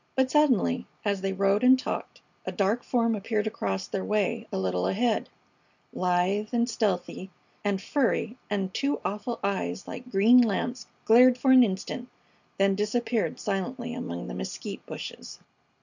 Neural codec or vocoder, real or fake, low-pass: none; real; 7.2 kHz